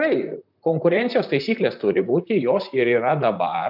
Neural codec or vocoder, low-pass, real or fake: vocoder, 44.1 kHz, 128 mel bands, Pupu-Vocoder; 5.4 kHz; fake